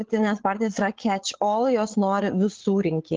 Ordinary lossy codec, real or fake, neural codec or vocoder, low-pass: Opus, 16 kbps; fake; codec, 16 kHz, 8 kbps, FreqCodec, larger model; 7.2 kHz